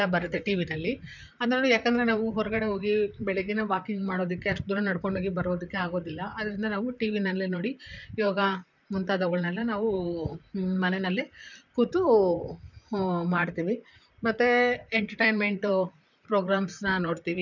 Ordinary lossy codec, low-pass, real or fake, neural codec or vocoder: none; 7.2 kHz; fake; vocoder, 44.1 kHz, 128 mel bands, Pupu-Vocoder